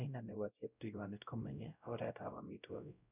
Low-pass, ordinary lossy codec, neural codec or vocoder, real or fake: 3.6 kHz; none; codec, 16 kHz, 0.5 kbps, X-Codec, WavLM features, trained on Multilingual LibriSpeech; fake